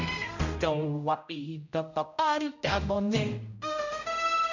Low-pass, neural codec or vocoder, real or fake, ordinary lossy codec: 7.2 kHz; codec, 16 kHz, 0.5 kbps, X-Codec, HuBERT features, trained on general audio; fake; none